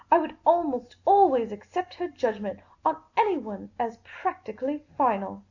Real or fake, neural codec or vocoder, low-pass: real; none; 7.2 kHz